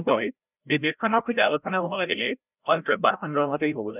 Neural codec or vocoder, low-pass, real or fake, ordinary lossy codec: codec, 16 kHz, 0.5 kbps, FreqCodec, larger model; 3.6 kHz; fake; none